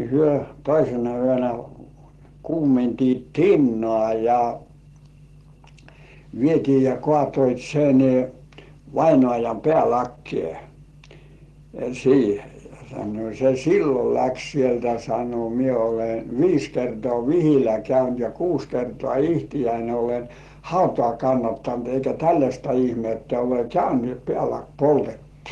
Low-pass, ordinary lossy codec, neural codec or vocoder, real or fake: 10.8 kHz; Opus, 16 kbps; none; real